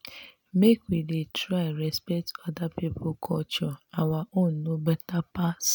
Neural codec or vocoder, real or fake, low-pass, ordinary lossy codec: none; real; none; none